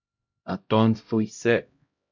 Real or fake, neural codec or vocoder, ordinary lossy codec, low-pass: fake; codec, 16 kHz, 0.5 kbps, X-Codec, HuBERT features, trained on LibriSpeech; MP3, 64 kbps; 7.2 kHz